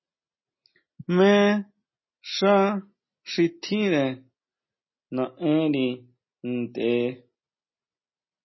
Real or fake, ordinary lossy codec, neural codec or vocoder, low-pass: real; MP3, 24 kbps; none; 7.2 kHz